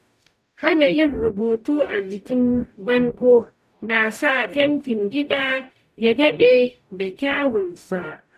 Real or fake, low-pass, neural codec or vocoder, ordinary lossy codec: fake; 14.4 kHz; codec, 44.1 kHz, 0.9 kbps, DAC; none